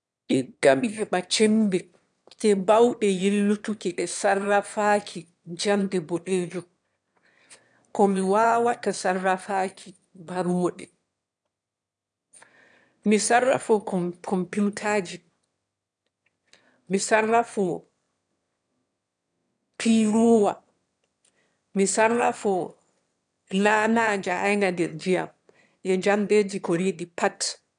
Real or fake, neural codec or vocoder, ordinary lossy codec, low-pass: fake; autoencoder, 22.05 kHz, a latent of 192 numbers a frame, VITS, trained on one speaker; none; 9.9 kHz